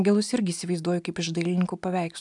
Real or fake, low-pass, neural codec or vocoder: real; 10.8 kHz; none